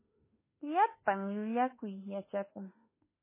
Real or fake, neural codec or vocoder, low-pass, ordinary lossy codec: fake; autoencoder, 48 kHz, 32 numbers a frame, DAC-VAE, trained on Japanese speech; 3.6 kHz; MP3, 16 kbps